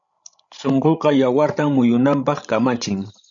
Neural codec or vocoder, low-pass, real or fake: codec, 16 kHz, 16 kbps, FreqCodec, larger model; 7.2 kHz; fake